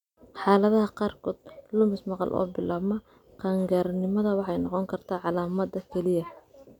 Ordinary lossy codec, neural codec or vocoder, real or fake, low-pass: none; none; real; 19.8 kHz